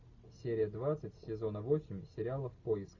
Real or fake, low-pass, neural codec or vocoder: real; 7.2 kHz; none